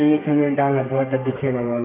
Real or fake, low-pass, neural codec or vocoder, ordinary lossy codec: fake; 3.6 kHz; codec, 32 kHz, 1.9 kbps, SNAC; none